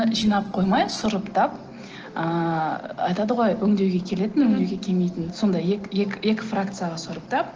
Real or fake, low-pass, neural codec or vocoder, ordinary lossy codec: real; 7.2 kHz; none; Opus, 16 kbps